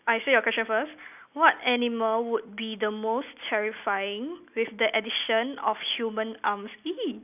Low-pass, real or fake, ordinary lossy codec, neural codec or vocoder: 3.6 kHz; real; none; none